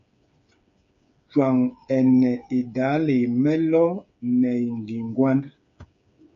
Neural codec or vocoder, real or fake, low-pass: codec, 16 kHz, 8 kbps, FreqCodec, smaller model; fake; 7.2 kHz